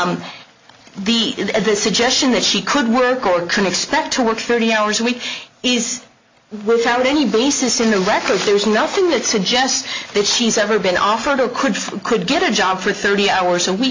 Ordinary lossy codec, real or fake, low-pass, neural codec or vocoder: MP3, 48 kbps; real; 7.2 kHz; none